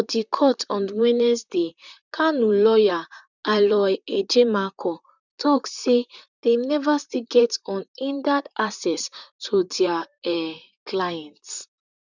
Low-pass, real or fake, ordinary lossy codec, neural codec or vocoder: 7.2 kHz; fake; none; vocoder, 44.1 kHz, 128 mel bands, Pupu-Vocoder